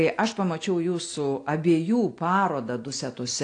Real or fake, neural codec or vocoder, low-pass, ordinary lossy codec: real; none; 9.9 kHz; AAC, 48 kbps